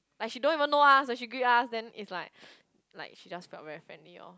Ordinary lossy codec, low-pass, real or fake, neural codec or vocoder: none; none; real; none